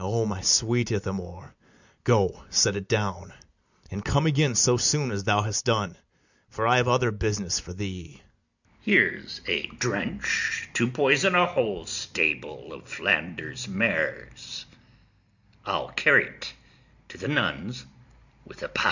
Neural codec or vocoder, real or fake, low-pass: none; real; 7.2 kHz